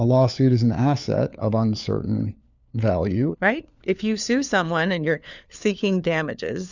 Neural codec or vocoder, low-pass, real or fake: codec, 16 kHz, 4 kbps, FunCodec, trained on LibriTTS, 50 frames a second; 7.2 kHz; fake